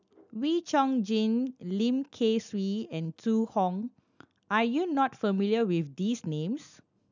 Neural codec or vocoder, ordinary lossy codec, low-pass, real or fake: none; none; 7.2 kHz; real